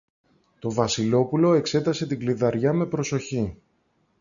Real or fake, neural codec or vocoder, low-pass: real; none; 7.2 kHz